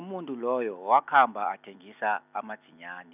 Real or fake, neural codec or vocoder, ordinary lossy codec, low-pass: real; none; none; 3.6 kHz